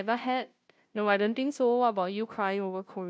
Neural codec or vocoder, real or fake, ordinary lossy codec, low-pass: codec, 16 kHz, 0.5 kbps, FunCodec, trained on Chinese and English, 25 frames a second; fake; none; none